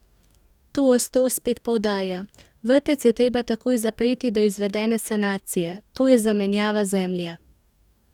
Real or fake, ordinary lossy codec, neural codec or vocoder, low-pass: fake; none; codec, 44.1 kHz, 2.6 kbps, DAC; 19.8 kHz